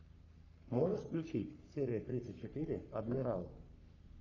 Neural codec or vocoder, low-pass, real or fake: codec, 44.1 kHz, 3.4 kbps, Pupu-Codec; 7.2 kHz; fake